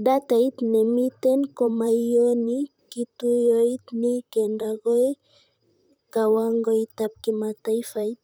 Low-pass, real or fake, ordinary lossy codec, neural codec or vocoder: none; fake; none; vocoder, 44.1 kHz, 128 mel bands, Pupu-Vocoder